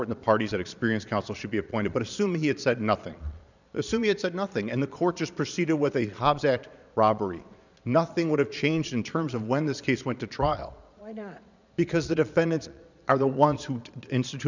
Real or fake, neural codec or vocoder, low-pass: fake; vocoder, 22.05 kHz, 80 mel bands, Vocos; 7.2 kHz